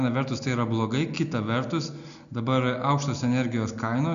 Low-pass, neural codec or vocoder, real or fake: 7.2 kHz; none; real